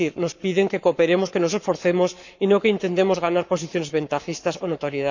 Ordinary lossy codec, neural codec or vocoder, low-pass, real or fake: none; codec, 44.1 kHz, 7.8 kbps, Pupu-Codec; 7.2 kHz; fake